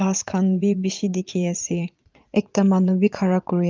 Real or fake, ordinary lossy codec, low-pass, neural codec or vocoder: fake; Opus, 32 kbps; 7.2 kHz; vocoder, 22.05 kHz, 80 mel bands, Vocos